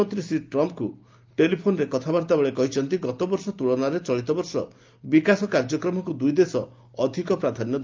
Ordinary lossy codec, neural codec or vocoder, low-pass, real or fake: Opus, 24 kbps; none; 7.2 kHz; real